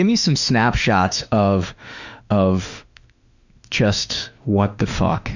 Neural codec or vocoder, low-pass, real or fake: autoencoder, 48 kHz, 32 numbers a frame, DAC-VAE, trained on Japanese speech; 7.2 kHz; fake